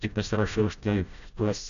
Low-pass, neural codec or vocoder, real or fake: 7.2 kHz; codec, 16 kHz, 0.5 kbps, FreqCodec, smaller model; fake